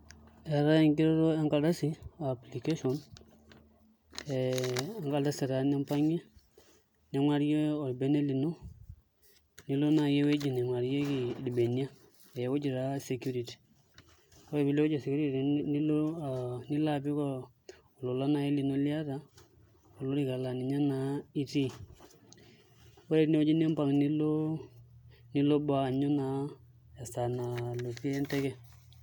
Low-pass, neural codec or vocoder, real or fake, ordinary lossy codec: none; none; real; none